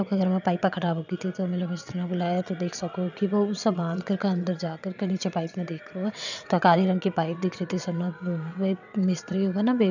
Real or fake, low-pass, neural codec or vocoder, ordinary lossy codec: fake; 7.2 kHz; vocoder, 22.05 kHz, 80 mel bands, WaveNeXt; none